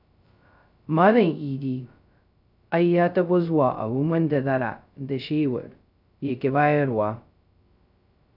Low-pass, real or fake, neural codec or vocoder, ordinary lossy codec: 5.4 kHz; fake; codec, 16 kHz, 0.2 kbps, FocalCodec; none